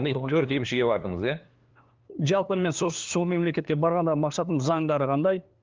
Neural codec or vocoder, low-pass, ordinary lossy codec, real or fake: codec, 16 kHz, 2 kbps, FunCodec, trained on LibriTTS, 25 frames a second; 7.2 kHz; Opus, 32 kbps; fake